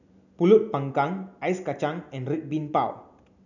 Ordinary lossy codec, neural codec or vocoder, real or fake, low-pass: none; none; real; 7.2 kHz